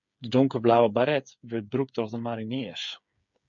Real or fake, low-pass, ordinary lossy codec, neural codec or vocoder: fake; 7.2 kHz; MP3, 64 kbps; codec, 16 kHz, 8 kbps, FreqCodec, smaller model